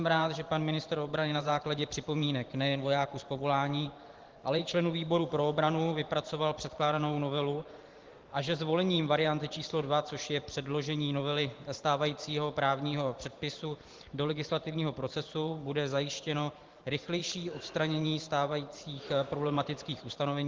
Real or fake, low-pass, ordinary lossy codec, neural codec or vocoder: fake; 7.2 kHz; Opus, 16 kbps; vocoder, 44.1 kHz, 128 mel bands every 512 samples, BigVGAN v2